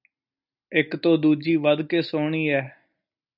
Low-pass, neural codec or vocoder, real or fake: 5.4 kHz; none; real